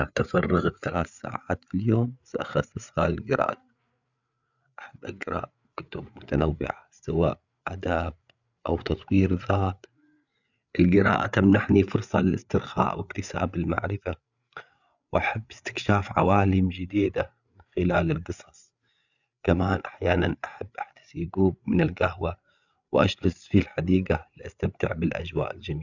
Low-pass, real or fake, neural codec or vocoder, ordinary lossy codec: 7.2 kHz; fake; codec, 16 kHz, 8 kbps, FreqCodec, larger model; none